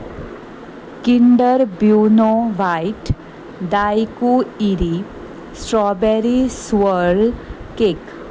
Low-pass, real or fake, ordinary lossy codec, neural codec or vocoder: none; real; none; none